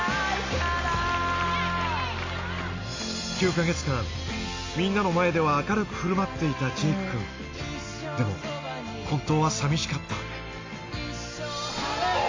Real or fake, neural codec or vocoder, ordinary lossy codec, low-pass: real; none; AAC, 32 kbps; 7.2 kHz